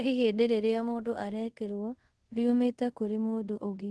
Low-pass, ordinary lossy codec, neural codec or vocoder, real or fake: 10.8 kHz; Opus, 16 kbps; codec, 24 kHz, 0.5 kbps, DualCodec; fake